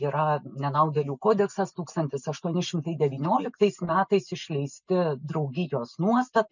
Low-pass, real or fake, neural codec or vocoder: 7.2 kHz; real; none